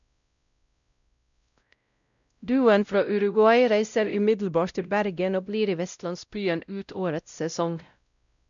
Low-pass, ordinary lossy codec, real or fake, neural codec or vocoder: 7.2 kHz; none; fake; codec, 16 kHz, 0.5 kbps, X-Codec, WavLM features, trained on Multilingual LibriSpeech